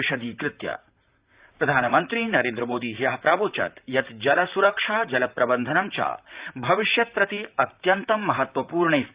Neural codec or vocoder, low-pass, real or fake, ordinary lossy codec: vocoder, 44.1 kHz, 128 mel bands, Pupu-Vocoder; 3.6 kHz; fake; Opus, 64 kbps